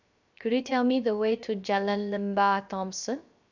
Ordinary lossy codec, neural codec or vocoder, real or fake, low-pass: none; codec, 16 kHz, 0.7 kbps, FocalCodec; fake; 7.2 kHz